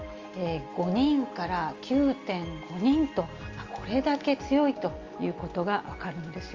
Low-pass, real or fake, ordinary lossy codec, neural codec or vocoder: 7.2 kHz; real; Opus, 32 kbps; none